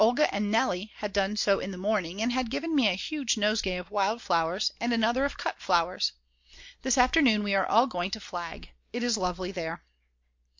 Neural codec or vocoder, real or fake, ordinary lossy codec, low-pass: none; real; MP3, 48 kbps; 7.2 kHz